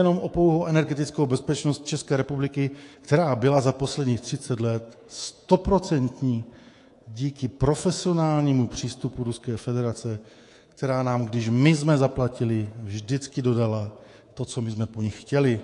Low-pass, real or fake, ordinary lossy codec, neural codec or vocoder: 10.8 kHz; fake; AAC, 48 kbps; codec, 24 kHz, 3.1 kbps, DualCodec